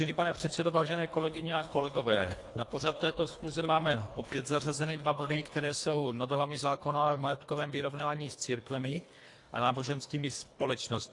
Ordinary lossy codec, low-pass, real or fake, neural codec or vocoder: AAC, 48 kbps; 10.8 kHz; fake; codec, 24 kHz, 1.5 kbps, HILCodec